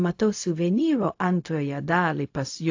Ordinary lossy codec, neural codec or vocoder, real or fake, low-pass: AAC, 48 kbps; codec, 16 kHz, 0.4 kbps, LongCat-Audio-Codec; fake; 7.2 kHz